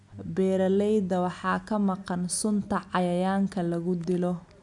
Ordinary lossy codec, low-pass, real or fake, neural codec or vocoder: none; 10.8 kHz; real; none